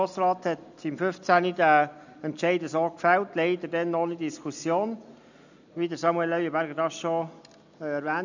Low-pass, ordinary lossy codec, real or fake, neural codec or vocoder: 7.2 kHz; none; real; none